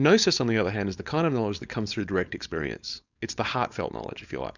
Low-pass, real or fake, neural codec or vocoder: 7.2 kHz; fake; codec, 16 kHz, 4.8 kbps, FACodec